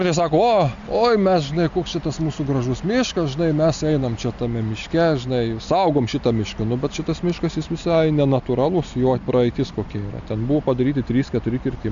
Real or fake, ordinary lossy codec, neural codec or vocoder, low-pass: real; MP3, 64 kbps; none; 7.2 kHz